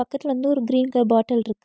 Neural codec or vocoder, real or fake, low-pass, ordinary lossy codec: none; real; none; none